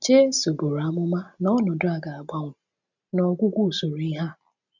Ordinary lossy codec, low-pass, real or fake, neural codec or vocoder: none; 7.2 kHz; real; none